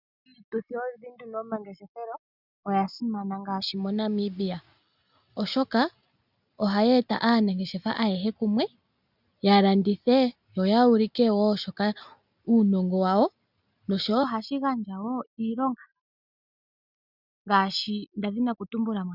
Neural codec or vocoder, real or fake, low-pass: none; real; 5.4 kHz